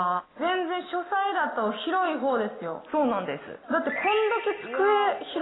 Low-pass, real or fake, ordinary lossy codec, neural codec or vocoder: 7.2 kHz; real; AAC, 16 kbps; none